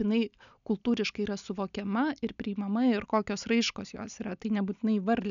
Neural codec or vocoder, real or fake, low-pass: none; real; 7.2 kHz